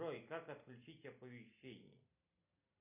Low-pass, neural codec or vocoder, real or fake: 3.6 kHz; none; real